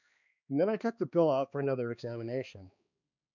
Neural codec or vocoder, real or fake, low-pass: codec, 16 kHz, 2 kbps, X-Codec, HuBERT features, trained on balanced general audio; fake; 7.2 kHz